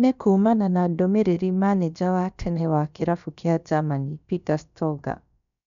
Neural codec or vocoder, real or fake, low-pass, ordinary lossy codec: codec, 16 kHz, about 1 kbps, DyCAST, with the encoder's durations; fake; 7.2 kHz; none